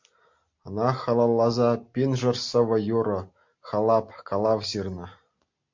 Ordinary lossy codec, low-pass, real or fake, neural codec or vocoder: MP3, 48 kbps; 7.2 kHz; real; none